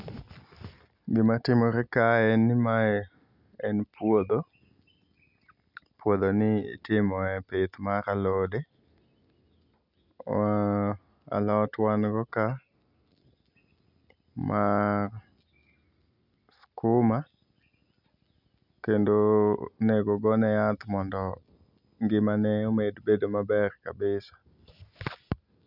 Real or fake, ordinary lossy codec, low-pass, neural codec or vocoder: real; none; 5.4 kHz; none